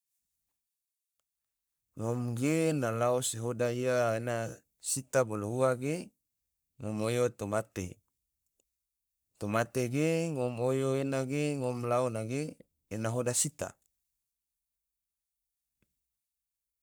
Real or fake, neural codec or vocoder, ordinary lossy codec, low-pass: fake; codec, 44.1 kHz, 3.4 kbps, Pupu-Codec; none; none